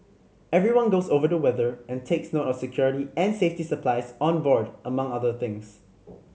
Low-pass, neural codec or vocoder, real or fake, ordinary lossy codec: none; none; real; none